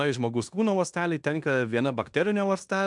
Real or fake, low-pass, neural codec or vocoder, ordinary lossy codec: fake; 10.8 kHz; codec, 16 kHz in and 24 kHz out, 0.9 kbps, LongCat-Audio-Codec, fine tuned four codebook decoder; MP3, 96 kbps